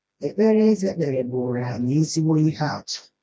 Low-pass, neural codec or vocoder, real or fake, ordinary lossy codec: none; codec, 16 kHz, 1 kbps, FreqCodec, smaller model; fake; none